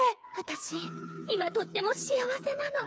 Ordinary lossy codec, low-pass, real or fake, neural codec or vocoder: none; none; fake; codec, 16 kHz, 4 kbps, FreqCodec, smaller model